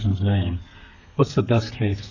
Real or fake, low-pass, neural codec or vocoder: fake; 7.2 kHz; codec, 32 kHz, 1.9 kbps, SNAC